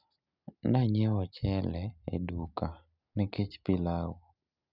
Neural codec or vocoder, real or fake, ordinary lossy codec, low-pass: none; real; none; 5.4 kHz